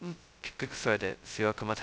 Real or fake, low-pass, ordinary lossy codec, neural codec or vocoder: fake; none; none; codec, 16 kHz, 0.2 kbps, FocalCodec